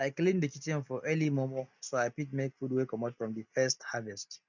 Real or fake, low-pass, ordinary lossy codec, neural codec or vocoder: real; 7.2 kHz; none; none